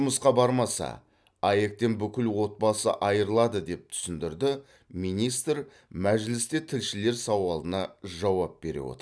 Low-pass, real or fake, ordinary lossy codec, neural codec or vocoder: none; real; none; none